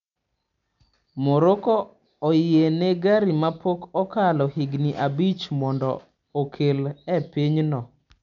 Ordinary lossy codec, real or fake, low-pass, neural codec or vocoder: none; real; 7.2 kHz; none